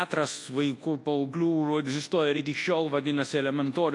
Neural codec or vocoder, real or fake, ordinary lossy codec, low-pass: codec, 24 kHz, 0.9 kbps, WavTokenizer, large speech release; fake; AAC, 48 kbps; 10.8 kHz